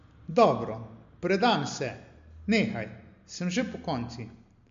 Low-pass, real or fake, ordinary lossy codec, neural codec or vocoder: 7.2 kHz; real; MP3, 48 kbps; none